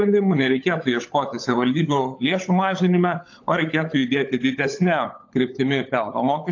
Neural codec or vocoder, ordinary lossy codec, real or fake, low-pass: codec, 16 kHz, 16 kbps, FunCodec, trained on LibriTTS, 50 frames a second; AAC, 48 kbps; fake; 7.2 kHz